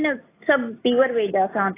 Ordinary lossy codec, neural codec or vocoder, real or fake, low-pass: AAC, 16 kbps; none; real; 3.6 kHz